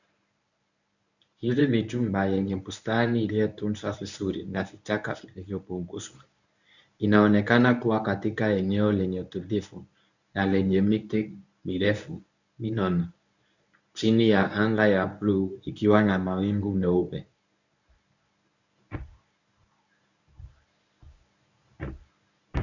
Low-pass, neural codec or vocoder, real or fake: 7.2 kHz; codec, 24 kHz, 0.9 kbps, WavTokenizer, medium speech release version 1; fake